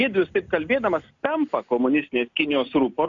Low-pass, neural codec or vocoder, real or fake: 7.2 kHz; none; real